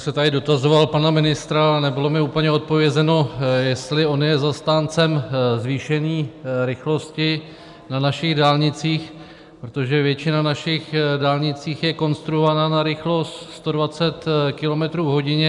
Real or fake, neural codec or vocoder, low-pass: real; none; 10.8 kHz